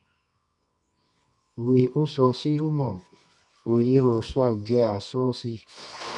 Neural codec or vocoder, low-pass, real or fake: codec, 24 kHz, 0.9 kbps, WavTokenizer, medium music audio release; 10.8 kHz; fake